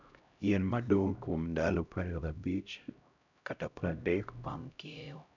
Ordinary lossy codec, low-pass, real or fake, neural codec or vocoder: none; 7.2 kHz; fake; codec, 16 kHz, 0.5 kbps, X-Codec, HuBERT features, trained on LibriSpeech